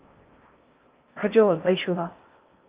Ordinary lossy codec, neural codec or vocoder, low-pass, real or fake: Opus, 24 kbps; codec, 16 kHz in and 24 kHz out, 0.6 kbps, FocalCodec, streaming, 4096 codes; 3.6 kHz; fake